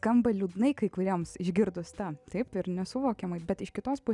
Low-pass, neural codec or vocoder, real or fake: 10.8 kHz; none; real